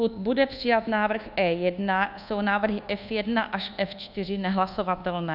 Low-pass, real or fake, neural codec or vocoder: 5.4 kHz; fake; codec, 24 kHz, 1.2 kbps, DualCodec